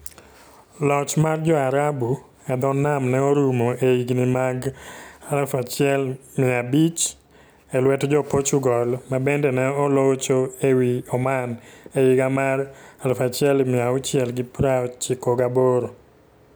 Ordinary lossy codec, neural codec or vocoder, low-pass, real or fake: none; none; none; real